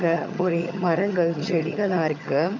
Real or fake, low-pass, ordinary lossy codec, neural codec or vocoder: fake; 7.2 kHz; none; vocoder, 22.05 kHz, 80 mel bands, HiFi-GAN